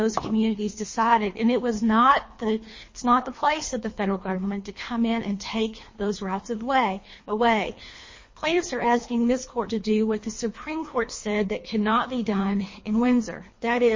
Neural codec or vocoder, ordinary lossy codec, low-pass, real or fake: codec, 24 kHz, 3 kbps, HILCodec; MP3, 32 kbps; 7.2 kHz; fake